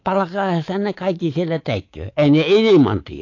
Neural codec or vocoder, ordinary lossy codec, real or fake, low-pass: codec, 24 kHz, 3.1 kbps, DualCodec; AAC, 48 kbps; fake; 7.2 kHz